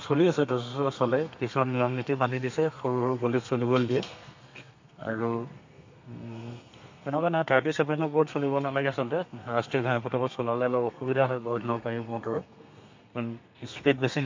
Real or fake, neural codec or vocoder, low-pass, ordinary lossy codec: fake; codec, 32 kHz, 1.9 kbps, SNAC; 7.2 kHz; MP3, 48 kbps